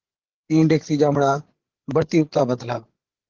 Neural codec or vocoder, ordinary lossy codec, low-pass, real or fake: codec, 16 kHz, 8 kbps, FreqCodec, larger model; Opus, 16 kbps; 7.2 kHz; fake